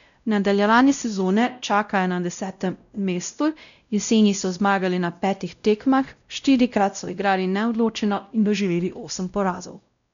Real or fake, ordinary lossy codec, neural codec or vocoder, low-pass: fake; none; codec, 16 kHz, 0.5 kbps, X-Codec, WavLM features, trained on Multilingual LibriSpeech; 7.2 kHz